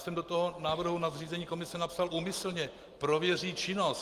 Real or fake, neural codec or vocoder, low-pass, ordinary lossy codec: real; none; 14.4 kHz; Opus, 24 kbps